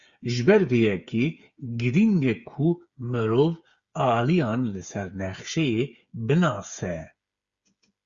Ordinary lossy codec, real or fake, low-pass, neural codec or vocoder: Opus, 64 kbps; fake; 7.2 kHz; codec, 16 kHz, 8 kbps, FreqCodec, smaller model